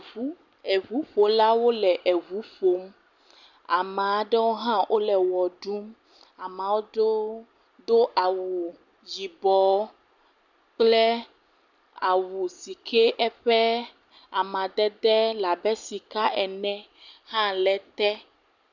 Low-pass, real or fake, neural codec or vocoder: 7.2 kHz; real; none